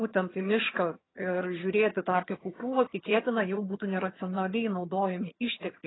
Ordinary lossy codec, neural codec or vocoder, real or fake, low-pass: AAC, 16 kbps; vocoder, 22.05 kHz, 80 mel bands, HiFi-GAN; fake; 7.2 kHz